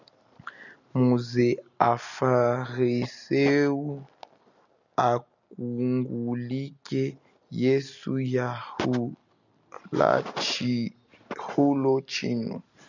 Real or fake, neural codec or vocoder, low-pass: real; none; 7.2 kHz